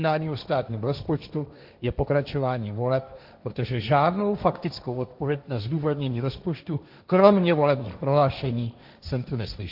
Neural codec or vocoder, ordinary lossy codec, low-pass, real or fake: codec, 16 kHz, 1.1 kbps, Voila-Tokenizer; AAC, 48 kbps; 5.4 kHz; fake